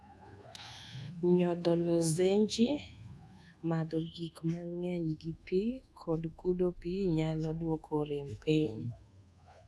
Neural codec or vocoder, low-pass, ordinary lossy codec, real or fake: codec, 24 kHz, 1.2 kbps, DualCodec; none; none; fake